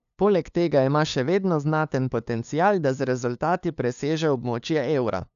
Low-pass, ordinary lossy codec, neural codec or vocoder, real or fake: 7.2 kHz; none; codec, 16 kHz, 2 kbps, FunCodec, trained on LibriTTS, 25 frames a second; fake